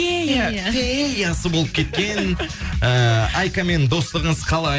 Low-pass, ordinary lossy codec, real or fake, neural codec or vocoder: none; none; real; none